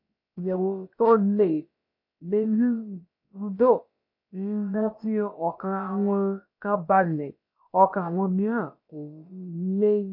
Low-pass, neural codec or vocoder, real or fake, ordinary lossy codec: 5.4 kHz; codec, 16 kHz, about 1 kbps, DyCAST, with the encoder's durations; fake; MP3, 32 kbps